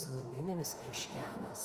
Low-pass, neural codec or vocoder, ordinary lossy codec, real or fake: 14.4 kHz; autoencoder, 48 kHz, 32 numbers a frame, DAC-VAE, trained on Japanese speech; Opus, 32 kbps; fake